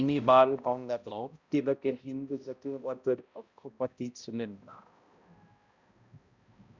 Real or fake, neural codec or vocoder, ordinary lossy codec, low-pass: fake; codec, 16 kHz, 0.5 kbps, X-Codec, HuBERT features, trained on balanced general audio; Opus, 64 kbps; 7.2 kHz